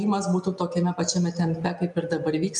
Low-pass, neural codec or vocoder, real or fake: 10.8 kHz; none; real